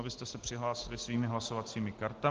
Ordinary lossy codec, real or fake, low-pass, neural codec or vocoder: Opus, 16 kbps; real; 7.2 kHz; none